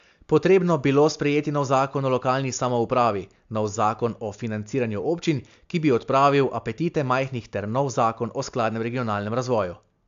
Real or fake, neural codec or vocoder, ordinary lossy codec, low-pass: real; none; AAC, 64 kbps; 7.2 kHz